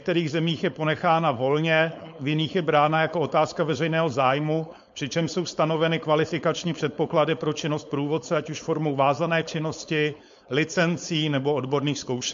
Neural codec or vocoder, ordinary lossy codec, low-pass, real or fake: codec, 16 kHz, 4.8 kbps, FACodec; MP3, 48 kbps; 7.2 kHz; fake